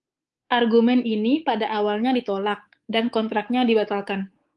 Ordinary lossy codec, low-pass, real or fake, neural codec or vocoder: Opus, 24 kbps; 10.8 kHz; fake; codec, 24 kHz, 3.1 kbps, DualCodec